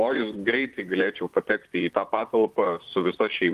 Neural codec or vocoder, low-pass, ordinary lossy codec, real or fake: vocoder, 44.1 kHz, 128 mel bands, Pupu-Vocoder; 14.4 kHz; Opus, 24 kbps; fake